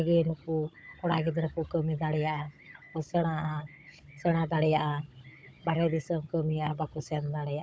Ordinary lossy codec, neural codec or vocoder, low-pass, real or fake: none; codec, 16 kHz, 16 kbps, FunCodec, trained on Chinese and English, 50 frames a second; none; fake